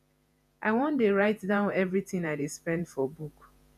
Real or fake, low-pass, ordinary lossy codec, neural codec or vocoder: fake; 14.4 kHz; none; vocoder, 48 kHz, 128 mel bands, Vocos